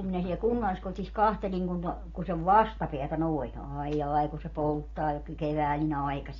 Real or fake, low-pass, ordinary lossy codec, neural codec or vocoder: real; 7.2 kHz; AAC, 32 kbps; none